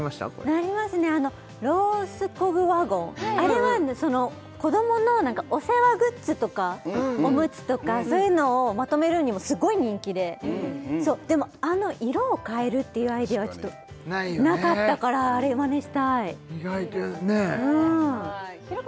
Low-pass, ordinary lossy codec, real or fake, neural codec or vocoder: none; none; real; none